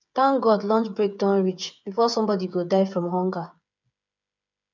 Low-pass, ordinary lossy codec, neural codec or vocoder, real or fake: 7.2 kHz; none; codec, 16 kHz, 8 kbps, FreqCodec, smaller model; fake